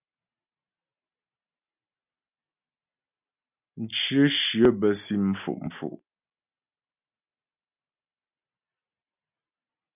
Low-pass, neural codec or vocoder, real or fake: 3.6 kHz; none; real